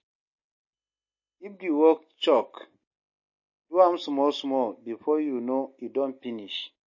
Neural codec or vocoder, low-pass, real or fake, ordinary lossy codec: none; 7.2 kHz; real; MP3, 32 kbps